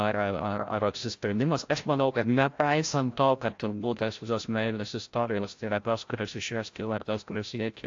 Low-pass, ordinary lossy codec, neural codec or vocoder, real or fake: 7.2 kHz; AAC, 48 kbps; codec, 16 kHz, 0.5 kbps, FreqCodec, larger model; fake